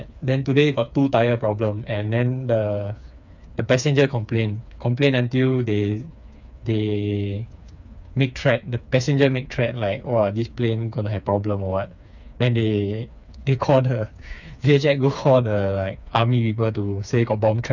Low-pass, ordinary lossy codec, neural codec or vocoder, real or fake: 7.2 kHz; none; codec, 16 kHz, 4 kbps, FreqCodec, smaller model; fake